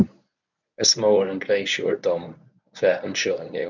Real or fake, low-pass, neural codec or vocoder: fake; 7.2 kHz; codec, 24 kHz, 0.9 kbps, WavTokenizer, medium speech release version 1